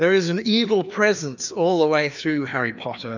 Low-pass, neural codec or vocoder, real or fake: 7.2 kHz; codec, 16 kHz, 2 kbps, FreqCodec, larger model; fake